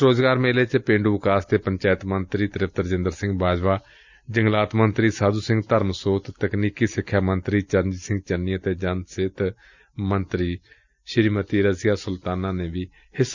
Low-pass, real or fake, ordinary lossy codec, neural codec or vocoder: 7.2 kHz; real; Opus, 64 kbps; none